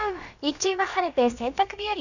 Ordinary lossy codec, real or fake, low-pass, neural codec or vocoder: none; fake; 7.2 kHz; codec, 16 kHz, about 1 kbps, DyCAST, with the encoder's durations